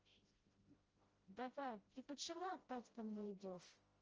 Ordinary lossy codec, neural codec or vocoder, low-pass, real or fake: Opus, 24 kbps; codec, 16 kHz, 0.5 kbps, FreqCodec, smaller model; 7.2 kHz; fake